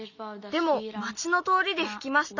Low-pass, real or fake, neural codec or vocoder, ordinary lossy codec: 7.2 kHz; real; none; none